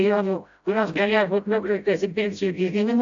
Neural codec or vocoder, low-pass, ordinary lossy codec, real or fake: codec, 16 kHz, 0.5 kbps, FreqCodec, smaller model; 7.2 kHz; AAC, 64 kbps; fake